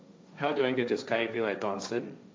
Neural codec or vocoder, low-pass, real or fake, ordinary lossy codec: codec, 16 kHz, 1.1 kbps, Voila-Tokenizer; none; fake; none